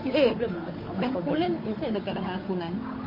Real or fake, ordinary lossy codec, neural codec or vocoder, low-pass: fake; MP3, 24 kbps; codec, 16 kHz, 8 kbps, FunCodec, trained on Chinese and English, 25 frames a second; 5.4 kHz